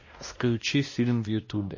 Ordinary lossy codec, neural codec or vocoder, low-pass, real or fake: MP3, 32 kbps; codec, 16 kHz, 1 kbps, X-Codec, WavLM features, trained on Multilingual LibriSpeech; 7.2 kHz; fake